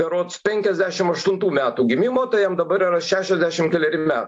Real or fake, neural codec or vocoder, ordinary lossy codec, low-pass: real; none; AAC, 64 kbps; 9.9 kHz